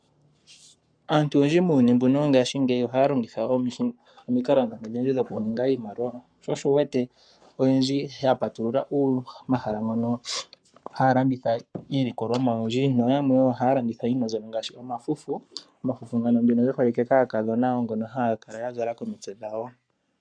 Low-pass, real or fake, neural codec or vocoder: 9.9 kHz; fake; codec, 44.1 kHz, 7.8 kbps, Pupu-Codec